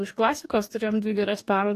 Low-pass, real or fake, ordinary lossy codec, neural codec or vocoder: 14.4 kHz; fake; AAC, 64 kbps; codec, 44.1 kHz, 2.6 kbps, DAC